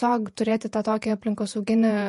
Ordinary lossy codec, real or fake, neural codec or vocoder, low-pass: MP3, 48 kbps; fake; vocoder, 48 kHz, 128 mel bands, Vocos; 14.4 kHz